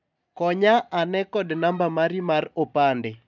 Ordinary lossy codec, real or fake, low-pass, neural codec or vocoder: none; real; 7.2 kHz; none